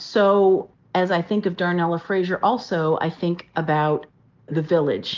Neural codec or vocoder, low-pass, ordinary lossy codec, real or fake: none; 7.2 kHz; Opus, 32 kbps; real